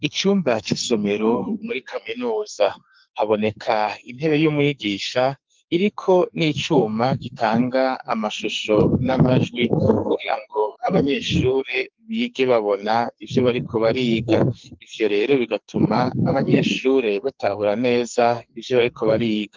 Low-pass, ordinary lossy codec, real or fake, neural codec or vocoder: 7.2 kHz; Opus, 32 kbps; fake; codec, 44.1 kHz, 2.6 kbps, SNAC